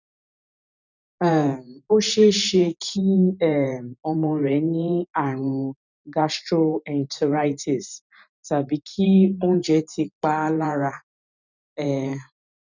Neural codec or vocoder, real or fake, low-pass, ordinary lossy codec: vocoder, 44.1 kHz, 128 mel bands every 512 samples, BigVGAN v2; fake; 7.2 kHz; none